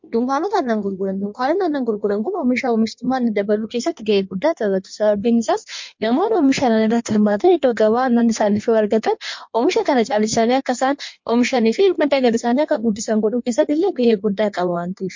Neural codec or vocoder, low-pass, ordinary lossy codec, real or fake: codec, 16 kHz in and 24 kHz out, 1.1 kbps, FireRedTTS-2 codec; 7.2 kHz; MP3, 48 kbps; fake